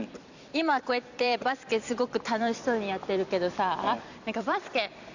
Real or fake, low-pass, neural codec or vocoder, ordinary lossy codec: fake; 7.2 kHz; vocoder, 44.1 kHz, 128 mel bands every 256 samples, BigVGAN v2; none